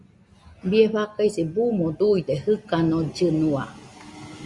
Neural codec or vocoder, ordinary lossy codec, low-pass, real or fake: none; Opus, 64 kbps; 10.8 kHz; real